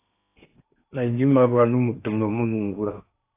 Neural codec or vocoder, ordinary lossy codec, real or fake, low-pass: codec, 16 kHz in and 24 kHz out, 0.8 kbps, FocalCodec, streaming, 65536 codes; AAC, 24 kbps; fake; 3.6 kHz